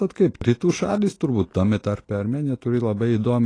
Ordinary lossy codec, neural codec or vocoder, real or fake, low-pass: AAC, 32 kbps; none; real; 9.9 kHz